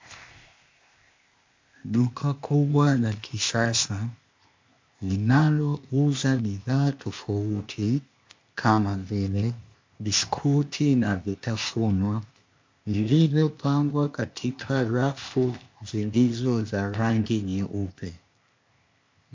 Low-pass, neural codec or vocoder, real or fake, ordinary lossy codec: 7.2 kHz; codec, 16 kHz, 0.8 kbps, ZipCodec; fake; MP3, 48 kbps